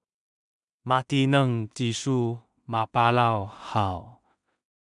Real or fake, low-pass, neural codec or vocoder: fake; 10.8 kHz; codec, 16 kHz in and 24 kHz out, 0.4 kbps, LongCat-Audio-Codec, two codebook decoder